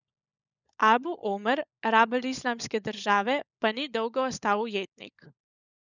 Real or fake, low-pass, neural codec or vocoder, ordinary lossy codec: fake; 7.2 kHz; codec, 16 kHz, 16 kbps, FunCodec, trained on LibriTTS, 50 frames a second; none